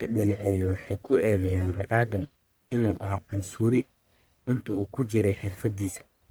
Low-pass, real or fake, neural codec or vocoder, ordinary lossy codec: none; fake; codec, 44.1 kHz, 1.7 kbps, Pupu-Codec; none